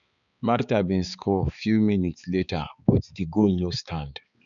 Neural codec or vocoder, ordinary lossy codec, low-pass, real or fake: codec, 16 kHz, 4 kbps, X-Codec, HuBERT features, trained on balanced general audio; none; 7.2 kHz; fake